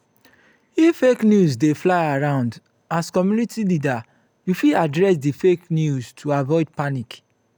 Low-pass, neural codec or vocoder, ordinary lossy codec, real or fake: none; none; none; real